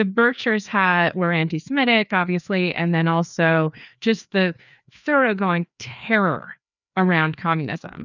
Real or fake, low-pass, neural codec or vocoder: fake; 7.2 kHz; codec, 16 kHz, 2 kbps, FreqCodec, larger model